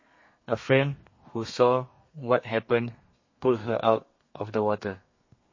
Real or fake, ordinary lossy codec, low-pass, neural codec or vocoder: fake; MP3, 32 kbps; 7.2 kHz; codec, 32 kHz, 1.9 kbps, SNAC